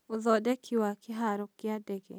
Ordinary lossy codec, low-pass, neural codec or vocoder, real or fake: none; none; none; real